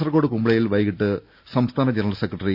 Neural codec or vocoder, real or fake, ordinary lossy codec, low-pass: none; real; Opus, 64 kbps; 5.4 kHz